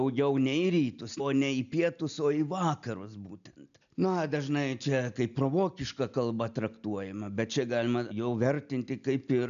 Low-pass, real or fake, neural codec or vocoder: 7.2 kHz; real; none